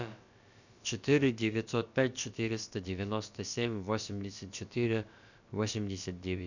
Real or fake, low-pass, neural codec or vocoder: fake; 7.2 kHz; codec, 16 kHz, about 1 kbps, DyCAST, with the encoder's durations